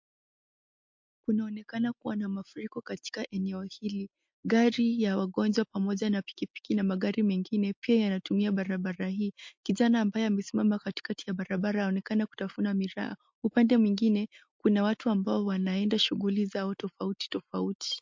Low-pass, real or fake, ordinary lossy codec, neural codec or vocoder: 7.2 kHz; real; MP3, 48 kbps; none